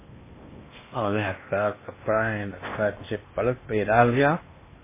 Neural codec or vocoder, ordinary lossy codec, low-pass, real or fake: codec, 16 kHz in and 24 kHz out, 0.8 kbps, FocalCodec, streaming, 65536 codes; MP3, 16 kbps; 3.6 kHz; fake